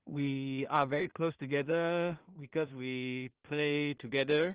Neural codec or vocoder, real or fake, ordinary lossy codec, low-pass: codec, 16 kHz in and 24 kHz out, 0.4 kbps, LongCat-Audio-Codec, two codebook decoder; fake; Opus, 16 kbps; 3.6 kHz